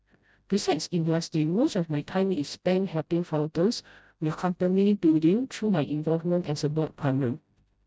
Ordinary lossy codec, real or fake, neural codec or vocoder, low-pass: none; fake; codec, 16 kHz, 0.5 kbps, FreqCodec, smaller model; none